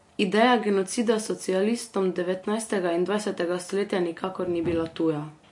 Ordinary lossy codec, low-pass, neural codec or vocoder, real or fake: MP3, 48 kbps; 10.8 kHz; none; real